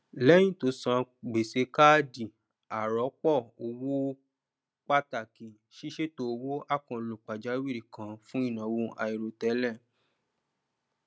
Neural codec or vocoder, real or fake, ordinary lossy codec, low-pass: none; real; none; none